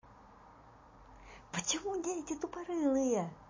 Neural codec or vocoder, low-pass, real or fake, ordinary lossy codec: none; 7.2 kHz; real; MP3, 32 kbps